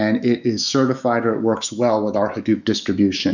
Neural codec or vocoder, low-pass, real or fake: autoencoder, 48 kHz, 128 numbers a frame, DAC-VAE, trained on Japanese speech; 7.2 kHz; fake